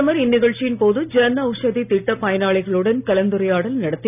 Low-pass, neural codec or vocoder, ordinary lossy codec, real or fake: 3.6 kHz; none; none; real